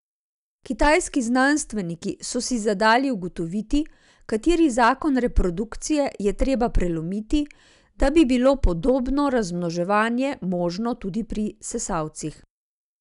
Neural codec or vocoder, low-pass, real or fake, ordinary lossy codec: none; 10.8 kHz; real; none